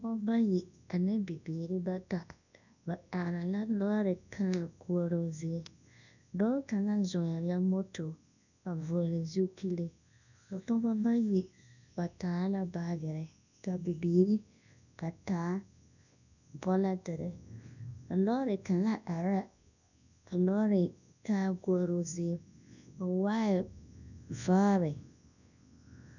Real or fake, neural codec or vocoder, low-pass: fake; codec, 24 kHz, 0.9 kbps, WavTokenizer, large speech release; 7.2 kHz